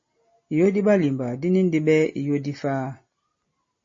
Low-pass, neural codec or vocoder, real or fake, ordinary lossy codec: 7.2 kHz; none; real; MP3, 32 kbps